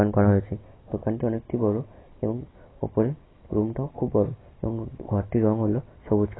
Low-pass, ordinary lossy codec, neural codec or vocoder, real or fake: 7.2 kHz; AAC, 16 kbps; none; real